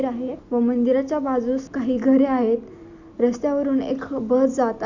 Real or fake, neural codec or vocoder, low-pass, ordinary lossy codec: real; none; 7.2 kHz; none